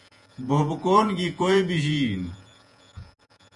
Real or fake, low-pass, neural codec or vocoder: fake; 10.8 kHz; vocoder, 48 kHz, 128 mel bands, Vocos